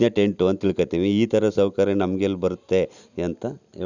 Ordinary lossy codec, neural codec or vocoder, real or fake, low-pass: none; none; real; 7.2 kHz